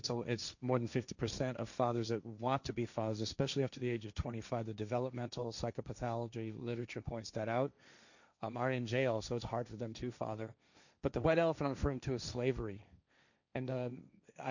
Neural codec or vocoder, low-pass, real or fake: codec, 16 kHz, 1.1 kbps, Voila-Tokenizer; 7.2 kHz; fake